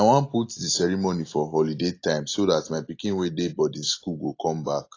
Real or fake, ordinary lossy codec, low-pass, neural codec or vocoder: real; AAC, 32 kbps; 7.2 kHz; none